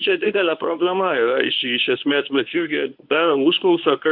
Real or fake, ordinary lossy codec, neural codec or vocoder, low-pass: fake; AAC, 48 kbps; codec, 24 kHz, 0.9 kbps, WavTokenizer, medium speech release version 1; 5.4 kHz